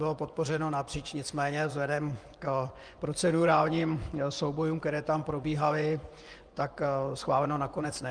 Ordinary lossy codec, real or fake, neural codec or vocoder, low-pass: Opus, 32 kbps; fake; vocoder, 24 kHz, 100 mel bands, Vocos; 9.9 kHz